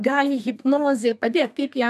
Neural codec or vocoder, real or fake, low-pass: codec, 32 kHz, 1.9 kbps, SNAC; fake; 14.4 kHz